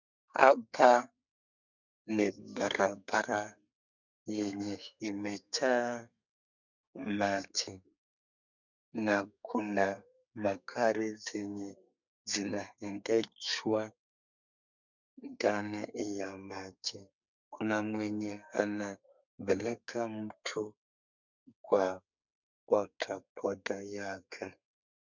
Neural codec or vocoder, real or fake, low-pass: codec, 32 kHz, 1.9 kbps, SNAC; fake; 7.2 kHz